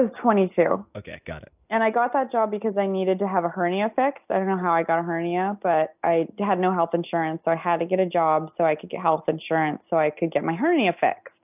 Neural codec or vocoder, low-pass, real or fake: none; 3.6 kHz; real